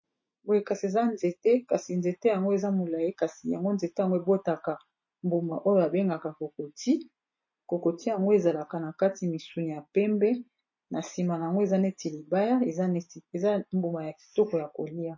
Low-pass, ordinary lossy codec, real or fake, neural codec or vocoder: 7.2 kHz; MP3, 32 kbps; real; none